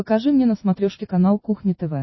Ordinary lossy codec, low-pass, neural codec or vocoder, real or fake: MP3, 24 kbps; 7.2 kHz; none; real